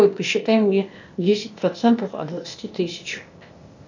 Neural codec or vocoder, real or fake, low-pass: codec, 16 kHz, 0.8 kbps, ZipCodec; fake; 7.2 kHz